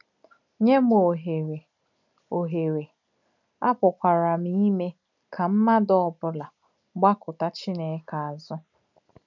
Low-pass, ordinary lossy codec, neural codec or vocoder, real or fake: 7.2 kHz; none; none; real